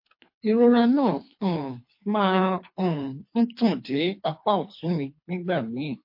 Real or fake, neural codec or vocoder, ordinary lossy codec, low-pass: fake; codec, 24 kHz, 3 kbps, HILCodec; MP3, 32 kbps; 5.4 kHz